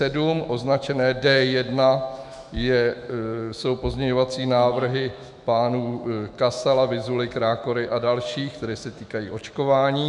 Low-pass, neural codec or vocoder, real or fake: 10.8 kHz; autoencoder, 48 kHz, 128 numbers a frame, DAC-VAE, trained on Japanese speech; fake